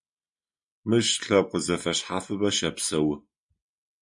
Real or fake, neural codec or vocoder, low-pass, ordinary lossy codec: real; none; 10.8 kHz; MP3, 96 kbps